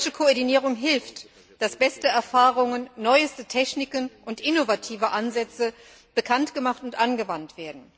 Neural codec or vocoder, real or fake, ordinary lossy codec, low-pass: none; real; none; none